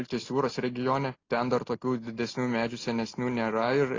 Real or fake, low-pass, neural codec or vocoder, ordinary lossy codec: real; 7.2 kHz; none; AAC, 32 kbps